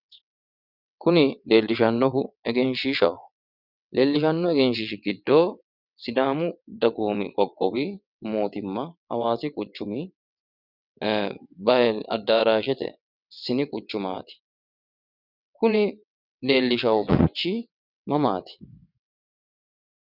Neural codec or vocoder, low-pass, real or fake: vocoder, 22.05 kHz, 80 mel bands, WaveNeXt; 5.4 kHz; fake